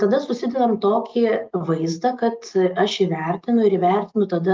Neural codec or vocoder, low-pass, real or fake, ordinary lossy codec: none; 7.2 kHz; real; Opus, 24 kbps